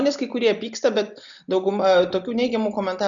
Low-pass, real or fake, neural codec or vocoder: 7.2 kHz; real; none